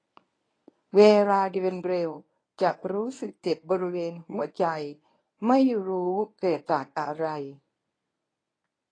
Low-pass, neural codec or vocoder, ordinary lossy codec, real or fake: 9.9 kHz; codec, 24 kHz, 0.9 kbps, WavTokenizer, medium speech release version 1; AAC, 32 kbps; fake